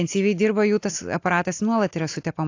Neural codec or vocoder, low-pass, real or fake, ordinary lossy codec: none; 7.2 kHz; real; AAC, 48 kbps